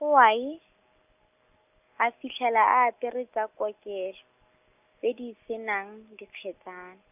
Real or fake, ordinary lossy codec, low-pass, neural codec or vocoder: real; none; 3.6 kHz; none